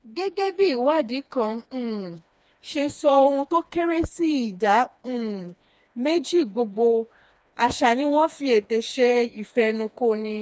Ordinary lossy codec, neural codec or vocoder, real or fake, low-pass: none; codec, 16 kHz, 2 kbps, FreqCodec, smaller model; fake; none